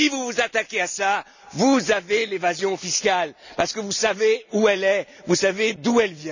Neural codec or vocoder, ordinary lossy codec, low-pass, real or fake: none; none; 7.2 kHz; real